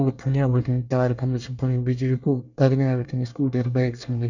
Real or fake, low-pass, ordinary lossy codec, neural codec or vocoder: fake; 7.2 kHz; none; codec, 24 kHz, 1 kbps, SNAC